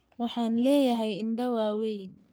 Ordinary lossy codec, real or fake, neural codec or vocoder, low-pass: none; fake; codec, 44.1 kHz, 3.4 kbps, Pupu-Codec; none